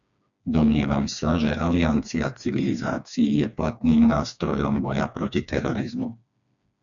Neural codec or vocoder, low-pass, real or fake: codec, 16 kHz, 2 kbps, FreqCodec, smaller model; 7.2 kHz; fake